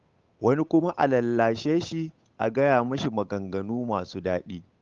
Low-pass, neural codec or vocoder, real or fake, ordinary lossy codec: 7.2 kHz; codec, 16 kHz, 8 kbps, FunCodec, trained on Chinese and English, 25 frames a second; fake; Opus, 32 kbps